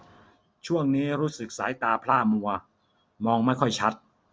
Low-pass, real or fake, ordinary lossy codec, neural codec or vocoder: none; real; none; none